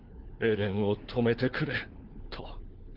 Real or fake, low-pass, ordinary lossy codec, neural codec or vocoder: fake; 5.4 kHz; Opus, 16 kbps; codec, 16 kHz, 4 kbps, FunCodec, trained on LibriTTS, 50 frames a second